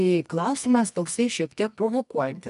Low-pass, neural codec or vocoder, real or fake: 10.8 kHz; codec, 24 kHz, 0.9 kbps, WavTokenizer, medium music audio release; fake